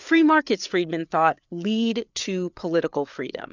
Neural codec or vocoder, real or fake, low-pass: codec, 16 kHz, 4 kbps, FreqCodec, larger model; fake; 7.2 kHz